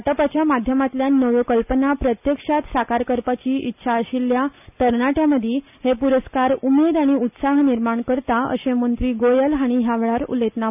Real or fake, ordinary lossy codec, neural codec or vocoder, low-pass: real; none; none; 3.6 kHz